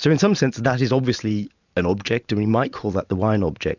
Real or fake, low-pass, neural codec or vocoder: fake; 7.2 kHz; vocoder, 44.1 kHz, 128 mel bands every 512 samples, BigVGAN v2